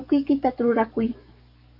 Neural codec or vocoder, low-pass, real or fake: codec, 44.1 kHz, 7.8 kbps, DAC; 5.4 kHz; fake